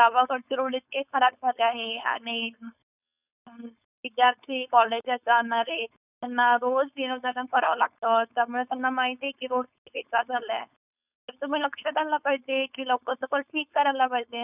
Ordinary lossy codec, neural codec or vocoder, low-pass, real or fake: none; codec, 16 kHz, 4.8 kbps, FACodec; 3.6 kHz; fake